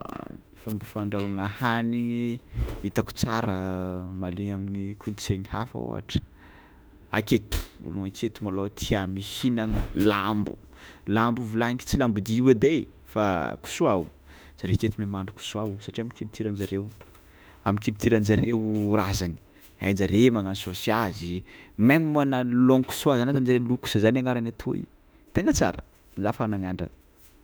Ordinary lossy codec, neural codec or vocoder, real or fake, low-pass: none; autoencoder, 48 kHz, 32 numbers a frame, DAC-VAE, trained on Japanese speech; fake; none